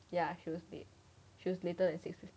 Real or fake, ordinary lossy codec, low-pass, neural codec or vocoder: real; none; none; none